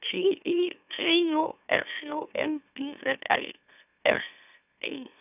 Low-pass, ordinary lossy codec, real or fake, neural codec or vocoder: 3.6 kHz; none; fake; autoencoder, 44.1 kHz, a latent of 192 numbers a frame, MeloTTS